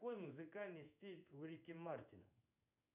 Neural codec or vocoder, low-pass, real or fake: codec, 16 kHz in and 24 kHz out, 1 kbps, XY-Tokenizer; 3.6 kHz; fake